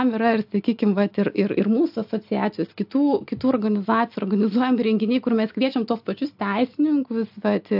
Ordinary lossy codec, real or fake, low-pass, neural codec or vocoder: AAC, 48 kbps; real; 5.4 kHz; none